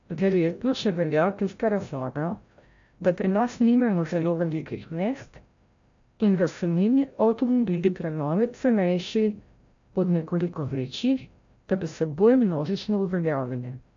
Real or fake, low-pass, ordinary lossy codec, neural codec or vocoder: fake; 7.2 kHz; AAC, 64 kbps; codec, 16 kHz, 0.5 kbps, FreqCodec, larger model